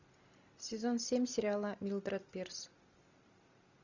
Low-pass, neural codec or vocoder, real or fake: 7.2 kHz; none; real